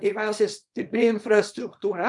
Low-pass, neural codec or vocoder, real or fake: 10.8 kHz; codec, 24 kHz, 0.9 kbps, WavTokenizer, small release; fake